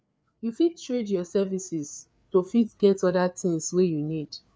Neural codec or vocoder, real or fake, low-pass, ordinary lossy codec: codec, 16 kHz, 4 kbps, FreqCodec, larger model; fake; none; none